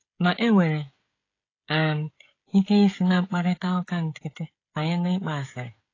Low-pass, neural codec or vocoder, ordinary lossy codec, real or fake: 7.2 kHz; codec, 16 kHz, 16 kbps, FreqCodec, smaller model; AAC, 32 kbps; fake